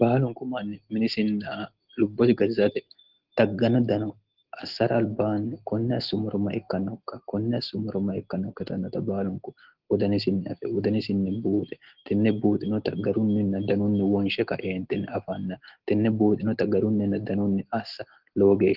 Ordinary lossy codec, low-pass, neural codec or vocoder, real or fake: Opus, 16 kbps; 5.4 kHz; none; real